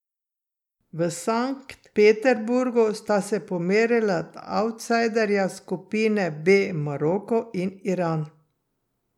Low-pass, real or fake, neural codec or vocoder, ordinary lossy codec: 19.8 kHz; real; none; none